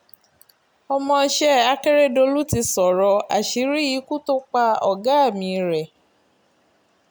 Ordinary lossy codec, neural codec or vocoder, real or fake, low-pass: none; none; real; none